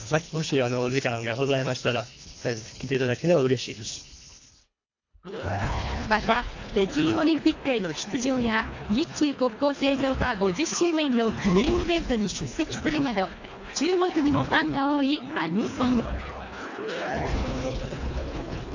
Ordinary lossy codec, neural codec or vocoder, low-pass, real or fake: AAC, 48 kbps; codec, 24 kHz, 1.5 kbps, HILCodec; 7.2 kHz; fake